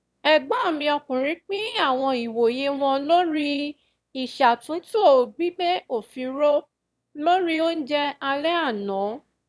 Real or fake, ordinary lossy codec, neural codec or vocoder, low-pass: fake; none; autoencoder, 22.05 kHz, a latent of 192 numbers a frame, VITS, trained on one speaker; none